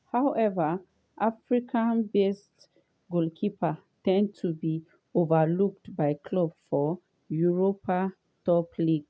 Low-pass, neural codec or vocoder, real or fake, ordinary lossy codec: none; none; real; none